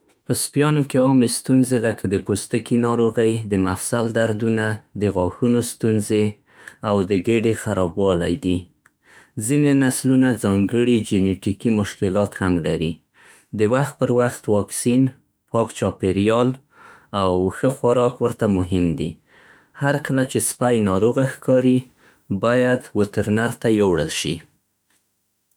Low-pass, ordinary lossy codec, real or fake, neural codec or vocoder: none; none; fake; autoencoder, 48 kHz, 32 numbers a frame, DAC-VAE, trained on Japanese speech